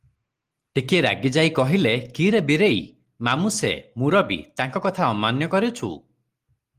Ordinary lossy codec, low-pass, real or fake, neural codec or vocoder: Opus, 24 kbps; 14.4 kHz; real; none